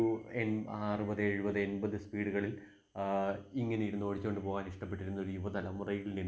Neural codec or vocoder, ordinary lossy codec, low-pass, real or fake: none; none; none; real